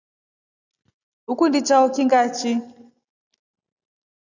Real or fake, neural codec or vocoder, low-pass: real; none; 7.2 kHz